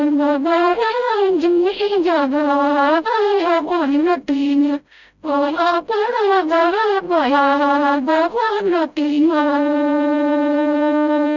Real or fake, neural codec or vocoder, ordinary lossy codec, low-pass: fake; codec, 16 kHz, 0.5 kbps, FreqCodec, smaller model; none; 7.2 kHz